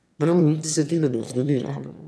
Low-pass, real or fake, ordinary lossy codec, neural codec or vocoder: none; fake; none; autoencoder, 22.05 kHz, a latent of 192 numbers a frame, VITS, trained on one speaker